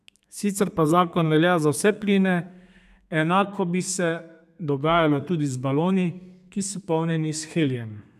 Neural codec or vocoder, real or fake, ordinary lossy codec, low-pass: codec, 32 kHz, 1.9 kbps, SNAC; fake; none; 14.4 kHz